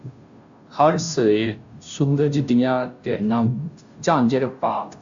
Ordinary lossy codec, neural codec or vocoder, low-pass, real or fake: AAC, 64 kbps; codec, 16 kHz, 0.5 kbps, FunCodec, trained on Chinese and English, 25 frames a second; 7.2 kHz; fake